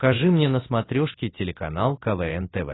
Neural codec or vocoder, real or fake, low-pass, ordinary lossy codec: none; real; 7.2 kHz; AAC, 16 kbps